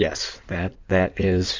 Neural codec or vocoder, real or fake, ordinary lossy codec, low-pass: codec, 16 kHz in and 24 kHz out, 2.2 kbps, FireRedTTS-2 codec; fake; MP3, 64 kbps; 7.2 kHz